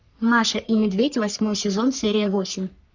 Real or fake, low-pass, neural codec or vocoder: fake; 7.2 kHz; codec, 44.1 kHz, 3.4 kbps, Pupu-Codec